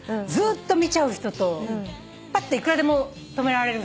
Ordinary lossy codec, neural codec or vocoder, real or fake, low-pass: none; none; real; none